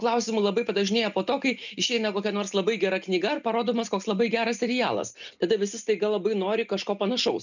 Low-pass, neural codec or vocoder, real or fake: 7.2 kHz; none; real